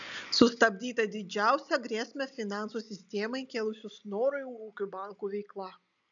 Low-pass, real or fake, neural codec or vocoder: 7.2 kHz; real; none